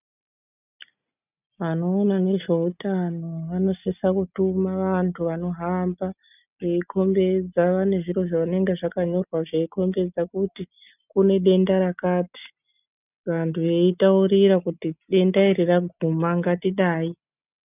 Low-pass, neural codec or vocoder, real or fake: 3.6 kHz; none; real